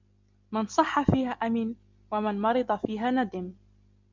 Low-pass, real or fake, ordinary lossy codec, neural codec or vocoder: 7.2 kHz; real; MP3, 64 kbps; none